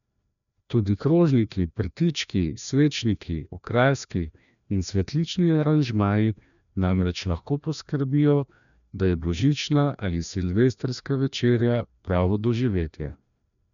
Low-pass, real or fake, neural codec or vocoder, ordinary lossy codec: 7.2 kHz; fake; codec, 16 kHz, 1 kbps, FreqCodec, larger model; none